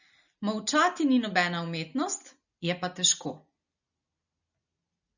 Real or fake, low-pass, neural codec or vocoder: real; 7.2 kHz; none